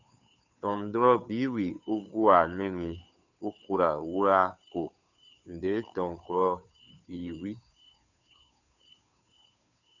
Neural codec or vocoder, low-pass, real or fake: codec, 16 kHz, 2 kbps, FunCodec, trained on Chinese and English, 25 frames a second; 7.2 kHz; fake